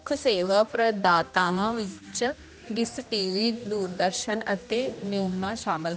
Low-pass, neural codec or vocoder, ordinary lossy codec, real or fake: none; codec, 16 kHz, 1 kbps, X-Codec, HuBERT features, trained on general audio; none; fake